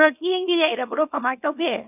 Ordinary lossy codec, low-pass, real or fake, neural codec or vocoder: none; 3.6 kHz; fake; codec, 24 kHz, 0.9 kbps, WavTokenizer, small release